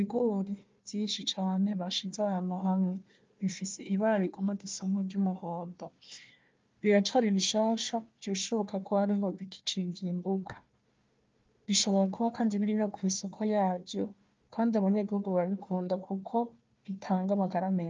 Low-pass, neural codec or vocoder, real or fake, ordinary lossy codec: 7.2 kHz; codec, 16 kHz, 1 kbps, FunCodec, trained on Chinese and English, 50 frames a second; fake; Opus, 24 kbps